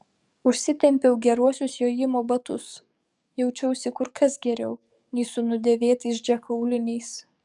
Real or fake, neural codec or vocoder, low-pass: fake; codec, 44.1 kHz, 7.8 kbps, DAC; 10.8 kHz